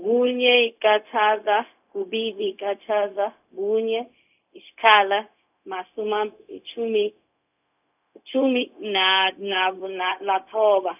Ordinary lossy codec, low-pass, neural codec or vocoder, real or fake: none; 3.6 kHz; codec, 16 kHz, 0.4 kbps, LongCat-Audio-Codec; fake